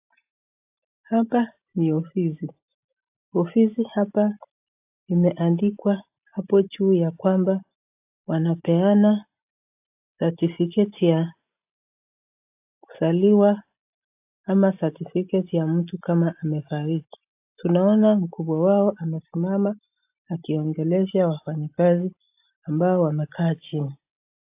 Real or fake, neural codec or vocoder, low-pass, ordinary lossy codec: real; none; 3.6 kHz; AAC, 32 kbps